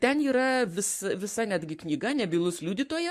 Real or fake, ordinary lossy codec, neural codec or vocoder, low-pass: fake; MP3, 64 kbps; codec, 44.1 kHz, 7.8 kbps, Pupu-Codec; 14.4 kHz